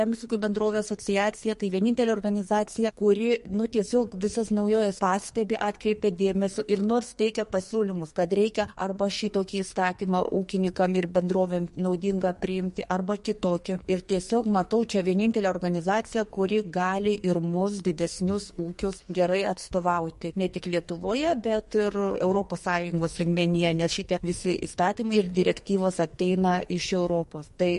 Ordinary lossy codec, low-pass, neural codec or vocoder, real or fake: MP3, 48 kbps; 14.4 kHz; codec, 44.1 kHz, 2.6 kbps, SNAC; fake